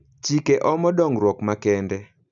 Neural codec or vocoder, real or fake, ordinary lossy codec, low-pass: none; real; none; 7.2 kHz